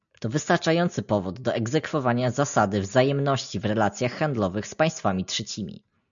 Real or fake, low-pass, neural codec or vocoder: real; 7.2 kHz; none